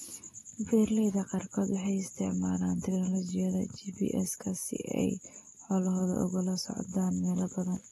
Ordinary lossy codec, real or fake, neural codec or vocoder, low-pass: AAC, 32 kbps; real; none; 19.8 kHz